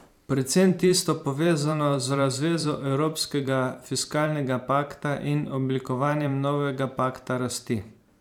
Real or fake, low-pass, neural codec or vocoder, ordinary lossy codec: fake; 19.8 kHz; vocoder, 44.1 kHz, 128 mel bands every 512 samples, BigVGAN v2; none